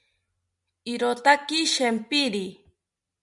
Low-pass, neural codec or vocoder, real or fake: 10.8 kHz; none; real